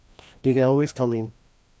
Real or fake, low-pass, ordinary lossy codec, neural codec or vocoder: fake; none; none; codec, 16 kHz, 1 kbps, FreqCodec, larger model